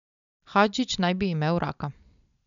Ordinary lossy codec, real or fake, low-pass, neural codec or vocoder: none; real; 7.2 kHz; none